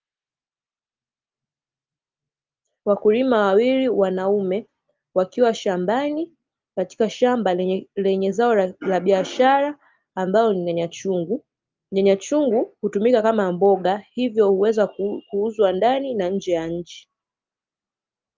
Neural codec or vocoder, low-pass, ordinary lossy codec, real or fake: none; 7.2 kHz; Opus, 24 kbps; real